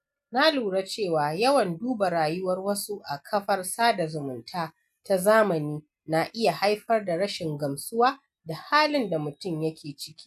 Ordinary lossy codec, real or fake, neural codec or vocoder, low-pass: none; real; none; 14.4 kHz